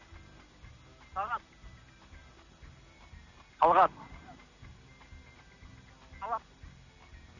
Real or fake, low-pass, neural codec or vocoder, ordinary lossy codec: real; 7.2 kHz; none; none